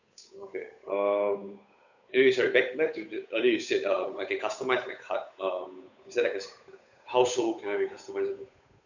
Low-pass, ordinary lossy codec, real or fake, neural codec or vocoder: 7.2 kHz; none; fake; codec, 16 kHz, 8 kbps, FunCodec, trained on Chinese and English, 25 frames a second